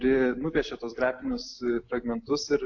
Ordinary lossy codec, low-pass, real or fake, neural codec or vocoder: AAC, 48 kbps; 7.2 kHz; real; none